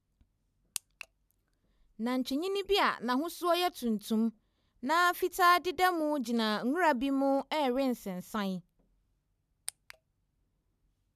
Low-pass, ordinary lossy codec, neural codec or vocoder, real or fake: 14.4 kHz; none; none; real